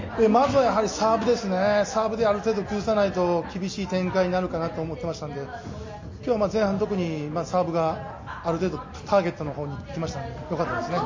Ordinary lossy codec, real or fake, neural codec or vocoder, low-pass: MP3, 32 kbps; real; none; 7.2 kHz